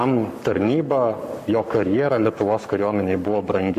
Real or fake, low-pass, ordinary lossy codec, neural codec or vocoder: fake; 14.4 kHz; MP3, 64 kbps; codec, 44.1 kHz, 7.8 kbps, Pupu-Codec